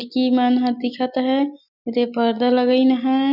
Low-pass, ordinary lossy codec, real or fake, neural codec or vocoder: 5.4 kHz; none; real; none